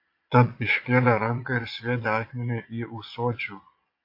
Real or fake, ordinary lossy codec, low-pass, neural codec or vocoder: fake; AAC, 32 kbps; 5.4 kHz; vocoder, 22.05 kHz, 80 mel bands, Vocos